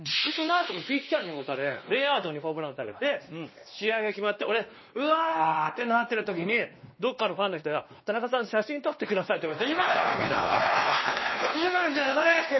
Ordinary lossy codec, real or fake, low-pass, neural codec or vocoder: MP3, 24 kbps; fake; 7.2 kHz; codec, 16 kHz, 2 kbps, X-Codec, WavLM features, trained on Multilingual LibriSpeech